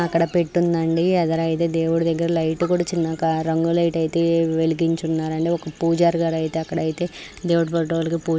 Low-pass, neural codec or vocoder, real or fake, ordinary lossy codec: none; none; real; none